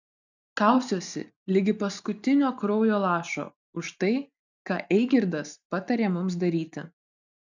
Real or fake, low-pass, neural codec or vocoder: real; 7.2 kHz; none